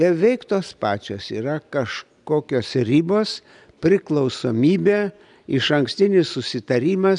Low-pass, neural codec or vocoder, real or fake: 10.8 kHz; none; real